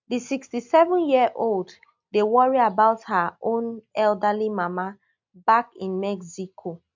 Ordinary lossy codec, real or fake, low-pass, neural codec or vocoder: MP3, 64 kbps; real; 7.2 kHz; none